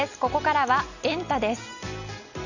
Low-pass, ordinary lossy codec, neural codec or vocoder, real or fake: 7.2 kHz; none; none; real